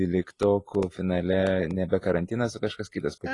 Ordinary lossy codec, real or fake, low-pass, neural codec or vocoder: AAC, 32 kbps; real; 10.8 kHz; none